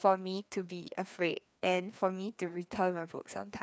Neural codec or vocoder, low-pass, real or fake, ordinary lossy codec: codec, 16 kHz, 2 kbps, FreqCodec, larger model; none; fake; none